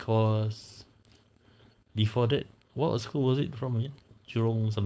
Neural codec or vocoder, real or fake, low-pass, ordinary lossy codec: codec, 16 kHz, 4.8 kbps, FACodec; fake; none; none